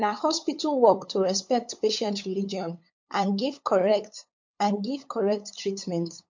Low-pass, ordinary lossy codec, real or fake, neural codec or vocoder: 7.2 kHz; MP3, 48 kbps; fake; codec, 16 kHz, 8 kbps, FunCodec, trained on LibriTTS, 25 frames a second